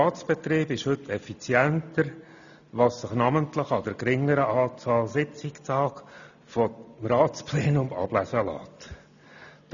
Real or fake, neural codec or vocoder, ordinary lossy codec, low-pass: real; none; none; 7.2 kHz